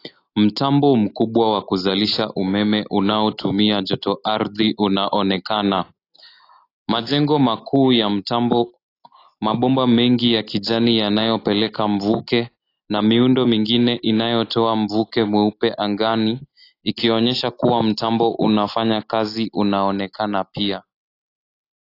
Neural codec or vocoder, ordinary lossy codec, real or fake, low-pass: none; AAC, 32 kbps; real; 5.4 kHz